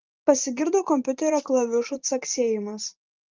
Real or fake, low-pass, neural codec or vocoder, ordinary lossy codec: fake; 7.2 kHz; vocoder, 44.1 kHz, 128 mel bands every 512 samples, BigVGAN v2; Opus, 32 kbps